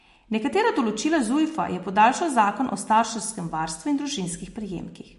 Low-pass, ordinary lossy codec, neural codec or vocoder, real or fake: 14.4 kHz; MP3, 48 kbps; none; real